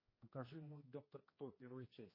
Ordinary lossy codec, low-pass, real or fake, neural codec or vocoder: MP3, 24 kbps; 5.4 kHz; fake; codec, 16 kHz, 1 kbps, X-Codec, HuBERT features, trained on general audio